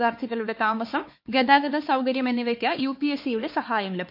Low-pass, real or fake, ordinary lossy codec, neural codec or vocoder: 5.4 kHz; fake; MP3, 32 kbps; codec, 16 kHz, 4 kbps, X-Codec, HuBERT features, trained on LibriSpeech